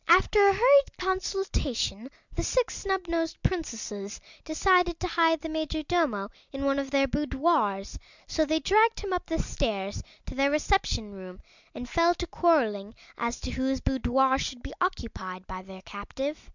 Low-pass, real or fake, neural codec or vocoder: 7.2 kHz; real; none